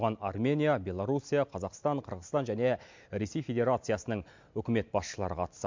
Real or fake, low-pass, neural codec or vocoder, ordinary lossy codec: real; 7.2 kHz; none; MP3, 64 kbps